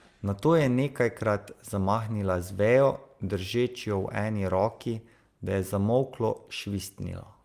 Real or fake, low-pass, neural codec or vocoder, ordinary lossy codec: real; 14.4 kHz; none; Opus, 32 kbps